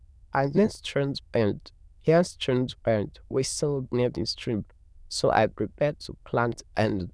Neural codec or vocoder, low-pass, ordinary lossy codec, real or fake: autoencoder, 22.05 kHz, a latent of 192 numbers a frame, VITS, trained on many speakers; none; none; fake